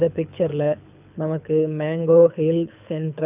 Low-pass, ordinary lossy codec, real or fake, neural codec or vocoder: 3.6 kHz; none; fake; codec, 24 kHz, 6 kbps, HILCodec